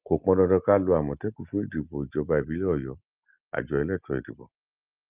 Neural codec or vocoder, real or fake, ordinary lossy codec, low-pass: none; real; Opus, 24 kbps; 3.6 kHz